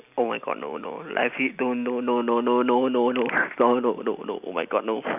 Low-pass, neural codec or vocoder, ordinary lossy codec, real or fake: 3.6 kHz; none; none; real